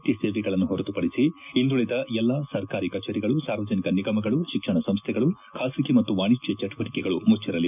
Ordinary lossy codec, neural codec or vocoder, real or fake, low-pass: none; none; real; 3.6 kHz